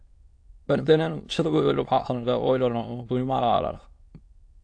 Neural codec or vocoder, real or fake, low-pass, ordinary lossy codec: autoencoder, 22.05 kHz, a latent of 192 numbers a frame, VITS, trained on many speakers; fake; 9.9 kHz; AAC, 48 kbps